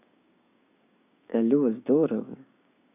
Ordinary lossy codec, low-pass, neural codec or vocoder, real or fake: none; 3.6 kHz; codec, 16 kHz, 6 kbps, DAC; fake